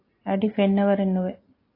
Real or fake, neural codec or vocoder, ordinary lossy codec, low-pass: real; none; AAC, 24 kbps; 5.4 kHz